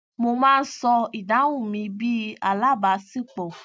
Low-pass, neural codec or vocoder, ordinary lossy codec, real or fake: none; none; none; real